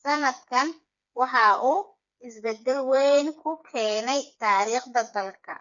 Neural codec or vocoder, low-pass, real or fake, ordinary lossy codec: codec, 16 kHz, 4 kbps, FreqCodec, smaller model; 7.2 kHz; fake; none